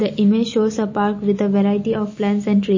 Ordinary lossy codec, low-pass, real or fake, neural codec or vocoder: MP3, 32 kbps; 7.2 kHz; real; none